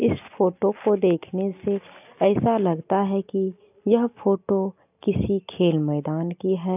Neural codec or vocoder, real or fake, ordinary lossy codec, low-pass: none; real; AAC, 32 kbps; 3.6 kHz